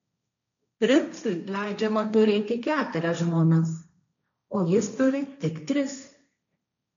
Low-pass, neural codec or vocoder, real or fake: 7.2 kHz; codec, 16 kHz, 1.1 kbps, Voila-Tokenizer; fake